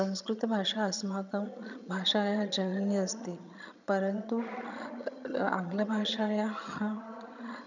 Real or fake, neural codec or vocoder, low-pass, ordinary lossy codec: fake; vocoder, 22.05 kHz, 80 mel bands, HiFi-GAN; 7.2 kHz; none